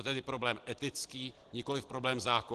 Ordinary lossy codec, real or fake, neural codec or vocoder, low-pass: Opus, 16 kbps; real; none; 10.8 kHz